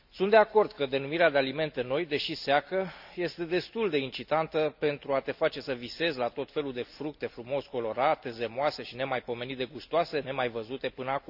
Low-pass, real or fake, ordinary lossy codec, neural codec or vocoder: 5.4 kHz; real; none; none